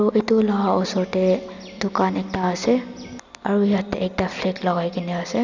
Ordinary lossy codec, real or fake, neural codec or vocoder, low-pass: none; real; none; 7.2 kHz